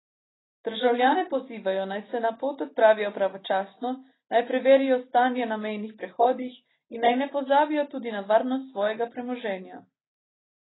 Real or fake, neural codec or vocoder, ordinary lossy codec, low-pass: real; none; AAC, 16 kbps; 7.2 kHz